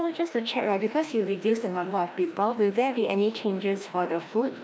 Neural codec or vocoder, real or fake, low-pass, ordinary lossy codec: codec, 16 kHz, 1 kbps, FreqCodec, larger model; fake; none; none